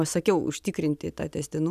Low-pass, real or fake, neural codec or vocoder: 14.4 kHz; real; none